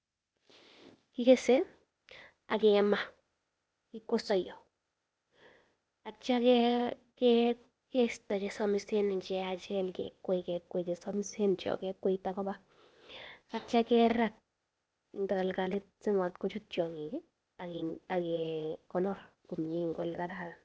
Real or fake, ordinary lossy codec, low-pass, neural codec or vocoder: fake; none; none; codec, 16 kHz, 0.8 kbps, ZipCodec